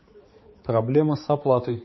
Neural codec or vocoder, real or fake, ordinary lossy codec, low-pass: codec, 16 kHz, 4 kbps, X-Codec, HuBERT features, trained on balanced general audio; fake; MP3, 24 kbps; 7.2 kHz